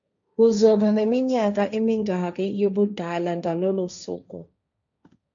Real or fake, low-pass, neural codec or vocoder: fake; 7.2 kHz; codec, 16 kHz, 1.1 kbps, Voila-Tokenizer